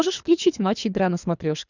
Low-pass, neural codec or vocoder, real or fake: 7.2 kHz; codec, 24 kHz, 3 kbps, HILCodec; fake